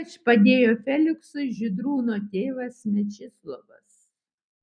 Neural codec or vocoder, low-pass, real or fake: none; 9.9 kHz; real